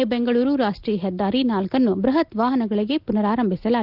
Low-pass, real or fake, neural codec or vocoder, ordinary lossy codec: 5.4 kHz; real; none; Opus, 24 kbps